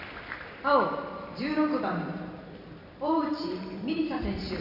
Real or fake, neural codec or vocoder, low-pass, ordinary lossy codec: fake; vocoder, 44.1 kHz, 128 mel bands every 256 samples, BigVGAN v2; 5.4 kHz; none